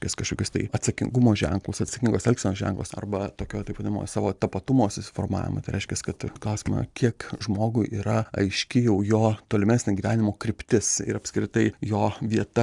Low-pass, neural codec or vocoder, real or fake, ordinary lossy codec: 10.8 kHz; none; real; MP3, 96 kbps